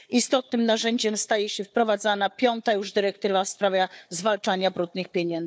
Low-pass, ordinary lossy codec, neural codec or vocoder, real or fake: none; none; codec, 16 kHz, 4 kbps, FunCodec, trained on Chinese and English, 50 frames a second; fake